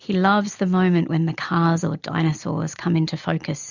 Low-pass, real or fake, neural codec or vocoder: 7.2 kHz; real; none